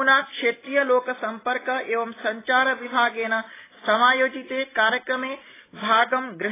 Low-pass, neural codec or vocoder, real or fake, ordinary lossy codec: 3.6 kHz; none; real; AAC, 16 kbps